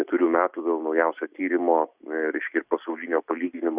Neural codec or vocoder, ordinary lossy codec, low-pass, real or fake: none; Opus, 64 kbps; 3.6 kHz; real